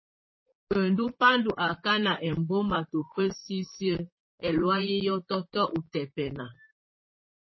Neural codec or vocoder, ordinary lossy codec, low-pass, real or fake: vocoder, 44.1 kHz, 128 mel bands, Pupu-Vocoder; MP3, 24 kbps; 7.2 kHz; fake